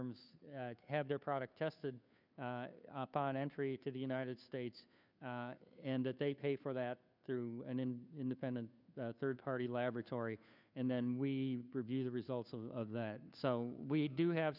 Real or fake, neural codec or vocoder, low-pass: fake; codec, 16 kHz, 2 kbps, FunCodec, trained on Chinese and English, 25 frames a second; 5.4 kHz